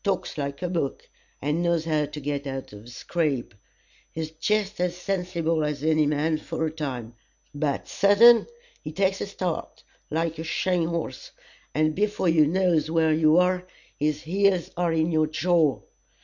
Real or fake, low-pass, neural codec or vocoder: real; 7.2 kHz; none